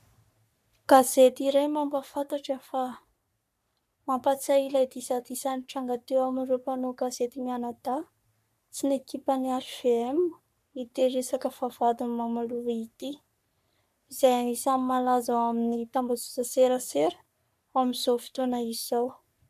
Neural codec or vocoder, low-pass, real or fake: codec, 44.1 kHz, 3.4 kbps, Pupu-Codec; 14.4 kHz; fake